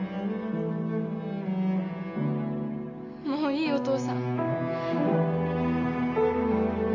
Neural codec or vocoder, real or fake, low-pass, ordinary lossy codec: none; real; 7.2 kHz; none